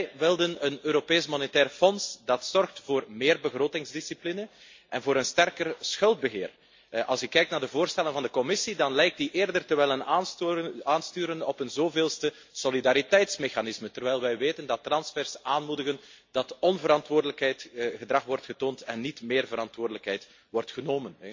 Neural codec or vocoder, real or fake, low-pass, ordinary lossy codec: none; real; 7.2 kHz; MP3, 48 kbps